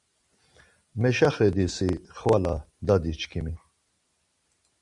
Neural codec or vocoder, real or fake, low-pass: none; real; 10.8 kHz